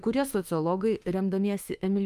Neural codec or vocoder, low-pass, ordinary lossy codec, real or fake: autoencoder, 48 kHz, 32 numbers a frame, DAC-VAE, trained on Japanese speech; 14.4 kHz; Opus, 32 kbps; fake